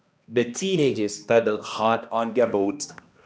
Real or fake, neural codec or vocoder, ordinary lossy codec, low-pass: fake; codec, 16 kHz, 1 kbps, X-Codec, HuBERT features, trained on balanced general audio; none; none